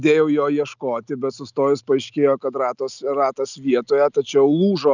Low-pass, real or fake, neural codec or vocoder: 7.2 kHz; real; none